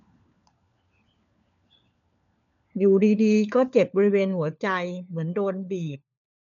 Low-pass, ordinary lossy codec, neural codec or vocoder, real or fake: 7.2 kHz; none; codec, 16 kHz, 4 kbps, FunCodec, trained on LibriTTS, 50 frames a second; fake